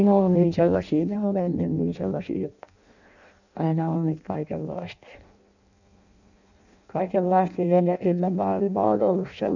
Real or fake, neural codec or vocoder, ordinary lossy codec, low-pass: fake; codec, 16 kHz in and 24 kHz out, 0.6 kbps, FireRedTTS-2 codec; none; 7.2 kHz